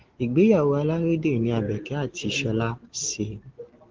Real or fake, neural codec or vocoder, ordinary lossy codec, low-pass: real; none; Opus, 16 kbps; 7.2 kHz